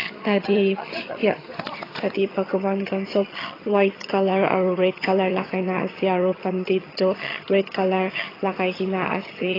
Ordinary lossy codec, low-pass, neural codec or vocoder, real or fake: AAC, 24 kbps; 5.4 kHz; vocoder, 22.05 kHz, 80 mel bands, HiFi-GAN; fake